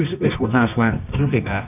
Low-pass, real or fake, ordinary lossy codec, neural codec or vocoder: 3.6 kHz; fake; none; codec, 24 kHz, 0.9 kbps, WavTokenizer, medium music audio release